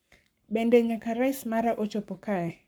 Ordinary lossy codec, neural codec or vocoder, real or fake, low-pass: none; codec, 44.1 kHz, 7.8 kbps, Pupu-Codec; fake; none